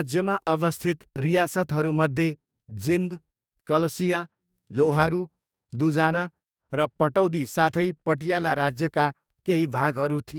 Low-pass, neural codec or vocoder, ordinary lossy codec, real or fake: 19.8 kHz; codec, 44.1 kHz, 2.6 kbps, DAC; none; fake